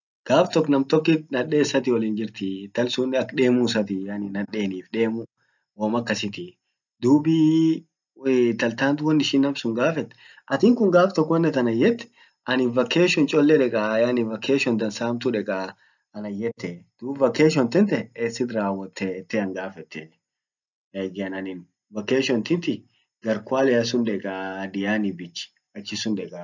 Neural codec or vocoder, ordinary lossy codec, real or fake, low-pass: none; none; real; 7.2 kHz